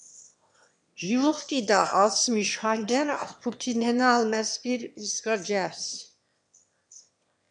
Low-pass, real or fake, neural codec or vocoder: 9.9 kHz; fake; autoencoder, 22.05 kHz, a latent of 192 numbers a frame, VITS, trained on one speaker